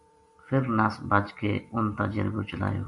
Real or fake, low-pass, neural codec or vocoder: fake; 10.8 kHz; vocoder, 44.1 kHz, 128 mel bands every 256 samples, BigVGAN v2